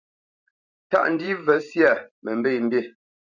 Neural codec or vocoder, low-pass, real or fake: vocoder, 44.1 kHz, 128 mel bands every 512 samples, BigVGAN v2; 7.2 kHz; fake